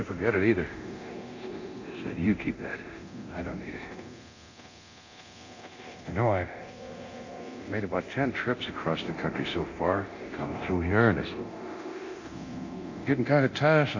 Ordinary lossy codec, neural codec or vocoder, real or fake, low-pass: AAC, 48 kbps; codec, 24 kHz, 0.9 kbps, DualCodec; fake; 7.2 kHz